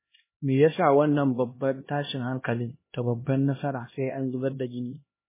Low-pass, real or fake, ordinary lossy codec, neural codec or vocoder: 3.6 kHz; fake; MP3, 16 kbps; codec, 16 kHz, 1 kbps, X-Codec, HuBERT features, trained on LibriSpeech